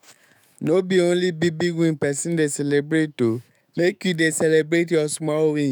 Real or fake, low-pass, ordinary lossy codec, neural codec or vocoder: fake; none; none; autoencoder, 48 kHz, 128 numbers a frame, DAC-VAE, trained on Japanese speech